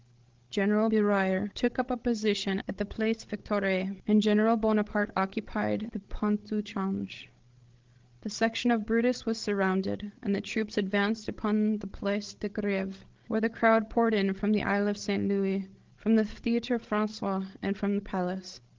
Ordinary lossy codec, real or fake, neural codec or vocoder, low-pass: Opus, 16 kbps; fake; codec, 16 kHz, 16 kbps, FreqCodec, larger model; 7.2 kHz